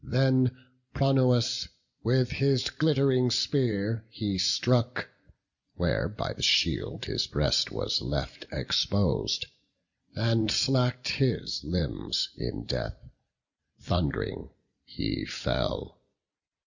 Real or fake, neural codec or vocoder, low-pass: real; none; 7.2 kHz